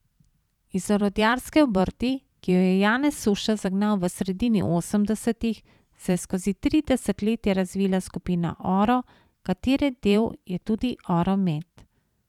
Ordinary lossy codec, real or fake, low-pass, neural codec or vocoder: none; fake; 19.8 kHz; vocoder, 44.1 kHz, 128 mel bands every 512 samples, BigVGAN v2